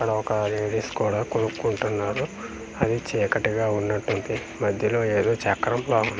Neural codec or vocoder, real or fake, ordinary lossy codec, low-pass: none; real; none; none